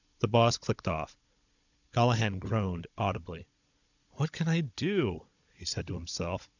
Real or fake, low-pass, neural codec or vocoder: fake; 7.2 kHz; codec, 16 kHz, 16 kbps, FunCodec, trained on Chinese and English, 50 frames a second